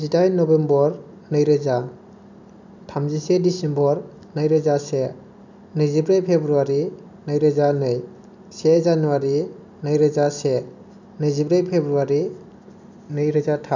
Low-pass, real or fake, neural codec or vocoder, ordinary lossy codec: 7.2 kHz; real; none; none